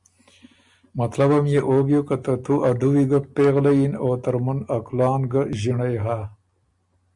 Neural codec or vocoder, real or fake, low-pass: none; real; 10.8 kHz